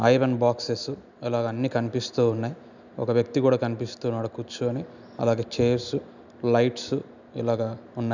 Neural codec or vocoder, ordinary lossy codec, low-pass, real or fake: none; none; 7.2 kHz; real